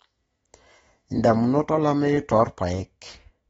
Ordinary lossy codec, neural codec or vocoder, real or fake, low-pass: AAC, 24 kbps; autoencoder, 48 kHz, 128 numbers a frame, DAC-VAE, trained on Japanese speech; fake; 19.8 kHz